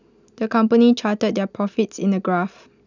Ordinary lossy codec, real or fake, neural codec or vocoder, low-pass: none; real; none; 7.2 kHz